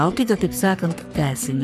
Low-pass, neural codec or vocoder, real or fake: 14.4 kHz; codec, 44.1 kHz, 3.4 kbps, Pupu-Codec; fake